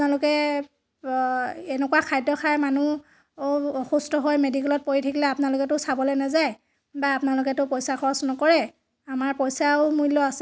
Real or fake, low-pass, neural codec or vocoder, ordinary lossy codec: real; none; none; none